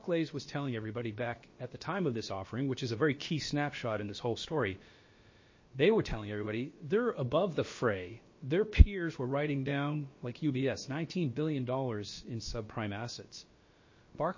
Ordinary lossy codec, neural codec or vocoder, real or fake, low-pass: MP3, 32 kbps; codec, 16 kHz, about 1 kbps, DyCAST, with the encoder's durations; fake; 7.2 kHz